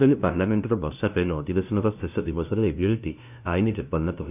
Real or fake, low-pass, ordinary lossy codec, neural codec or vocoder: fake; 3.6 kHz; none; codec, 16 kHz, 0.5 kbps, FunCodec, trained on LibriTTS, 25 frames a second